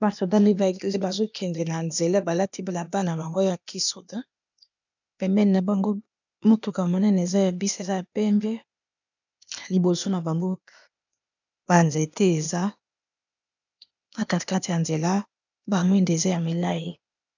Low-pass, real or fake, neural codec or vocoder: 7.2 kHz; fake; codec, 16 kHz, 0.8 kbps, ZipCodec